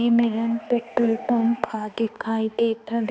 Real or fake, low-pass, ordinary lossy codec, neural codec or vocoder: fake; none; none; codec, 16 kHz, 2 kbps, X-Codec, HuBERT features, trained on balanced general audio